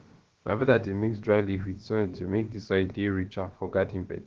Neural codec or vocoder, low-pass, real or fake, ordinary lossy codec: codec, 16 kHz, about 1 kbps, DyCAST, with the encoder's durations; 7.2 kHz; fake; Opus, 16 kbps